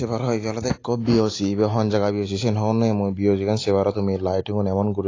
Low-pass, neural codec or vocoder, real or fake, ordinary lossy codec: 7.2 kHz; none; real; AAC, 32 kbps